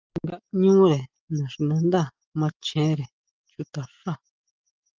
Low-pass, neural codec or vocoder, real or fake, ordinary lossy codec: 7.2 kHz; none; real; Opus, 32 kbps